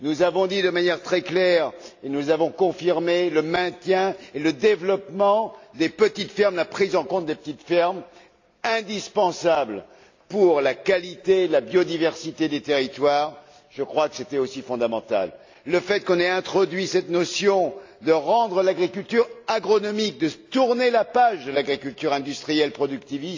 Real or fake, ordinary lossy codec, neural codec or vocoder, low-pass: real; AAC, 48 kbps; none; 7.2 kHz